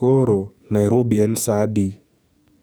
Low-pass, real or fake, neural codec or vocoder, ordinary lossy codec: none; fake; codec, 44.1 kHz, 2.6 kbps, SNAC; none